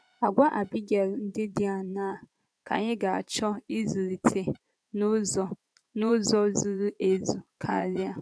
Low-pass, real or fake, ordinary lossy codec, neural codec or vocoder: none; fake; none; vocoder, 22.05 kHz, 80 mel bands, Vocos